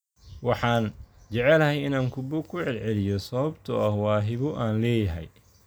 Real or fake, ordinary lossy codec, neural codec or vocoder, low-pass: real; none; none; none